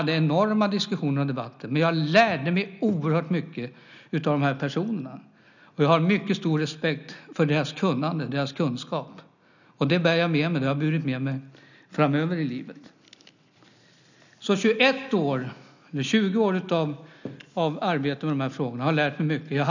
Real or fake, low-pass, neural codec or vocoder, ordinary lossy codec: real; 7.2 kHz; none; none